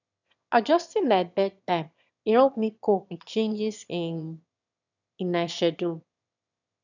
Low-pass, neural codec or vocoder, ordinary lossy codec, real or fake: 7.2 kHz; autoencoder, 22.05 kHz, a latent of 192 numbers a frame, VITS, trained on one speaker; none; fake